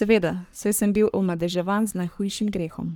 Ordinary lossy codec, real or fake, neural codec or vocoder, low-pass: none; fake; codec, 44.1 kHz, 3.4 kbps, Pupu-Codec; none